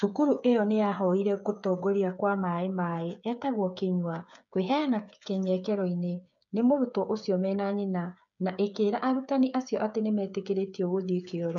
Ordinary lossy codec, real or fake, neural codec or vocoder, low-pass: none; fake; codec, 16 kHz, 8 kbps, FreqCodec, smaller model; 7.2 kHz